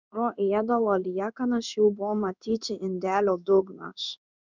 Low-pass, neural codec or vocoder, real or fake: 7.2 kHz; codec, 16 kHz in and 24 kHz out, 1 kbps, XY-Tokenizer; fake